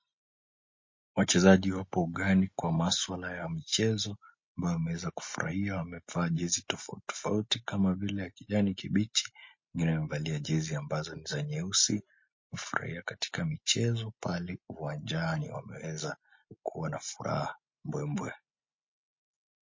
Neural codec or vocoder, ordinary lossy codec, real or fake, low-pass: none; MP3, 32 kbps; real; 7.2 kHz